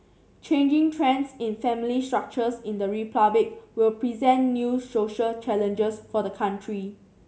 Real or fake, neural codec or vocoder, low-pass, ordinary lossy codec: real; none; none; none